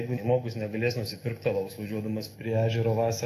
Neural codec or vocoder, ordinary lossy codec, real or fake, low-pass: autoencoder, 48 kHz, 128 numbers a frame, DAC-VAE, trained on Japanese speech; AAC, 48 kbps; fake; 14.4 kHz